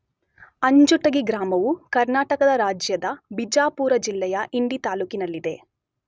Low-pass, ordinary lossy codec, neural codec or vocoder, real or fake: none; none; none; real